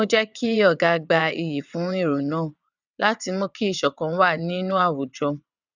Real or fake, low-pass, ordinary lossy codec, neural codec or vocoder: fake; 7.2 kHz; none; vocoder, 22.05 kHz, 80 mel bands, WaveNeXt